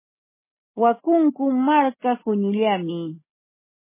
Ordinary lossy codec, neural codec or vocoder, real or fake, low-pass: MP3, 16 kbps; none; real; 3.6 kHz